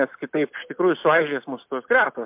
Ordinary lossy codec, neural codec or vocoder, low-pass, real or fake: MP3, 32 kbps; none; 3.6 kHz; real